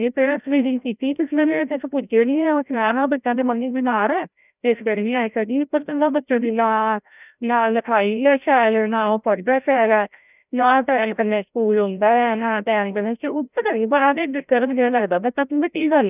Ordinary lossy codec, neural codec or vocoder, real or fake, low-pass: none; codec, 16 kHz, 0.5 kbps, FreqCodec, larger model; fake; 3.6 kHz